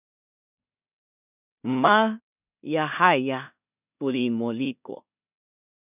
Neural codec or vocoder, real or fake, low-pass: codec, 16 kHz in and 24 kHz out, 0.4 kbps, LongCat-Audio-Codec, two codebook decoder; fake; 3.6 kHz